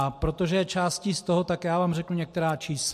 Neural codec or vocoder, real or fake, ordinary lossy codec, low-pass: none; real; MP3, 64 kbps; 14.4 kHz